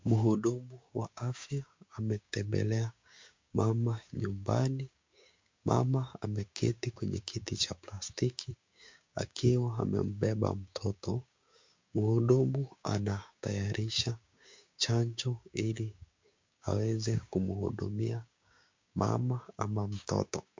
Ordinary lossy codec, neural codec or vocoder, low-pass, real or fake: MP3, 48 kbps; codec, 16 kHz, 6 kbps, DAC; 7.2 kHz; fake